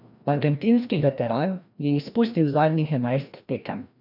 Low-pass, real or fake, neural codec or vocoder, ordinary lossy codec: 5.4 kHz; fake; codec, 16 kHz, 1 kbps, FreqCodec, larger model; none